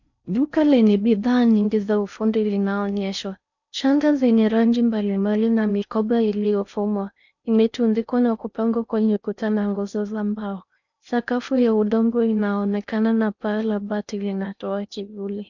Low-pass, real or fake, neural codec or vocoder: 7.2 kHz; fake; codec, 16 kHz in and 24 kHz out, 0.6 kbps, FocalCodec, streaming, 4096 codes